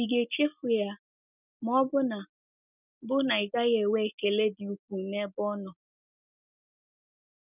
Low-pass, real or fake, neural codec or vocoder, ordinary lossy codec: 3.6 kHz; real; none; none